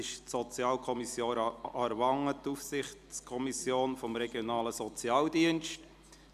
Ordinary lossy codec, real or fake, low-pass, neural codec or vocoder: none; real; 14.4 kHz; none